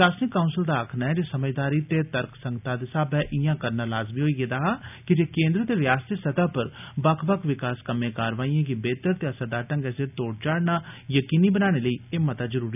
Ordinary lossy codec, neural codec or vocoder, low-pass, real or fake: none; none; 3.6 kHz; real